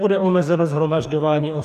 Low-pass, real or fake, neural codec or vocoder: 14.4 kHz; fake; codec, 44.1 kHz, 2.6 kbps, DAC